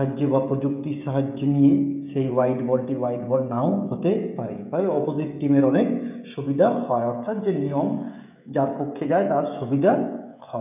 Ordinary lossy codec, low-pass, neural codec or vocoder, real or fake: none; 3.6 kHz; codec, 16 kHz, 16 kbps, FreqCodec, smaller model; fake